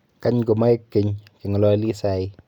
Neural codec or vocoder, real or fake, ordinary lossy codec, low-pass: none; real; none; 19.8 kHz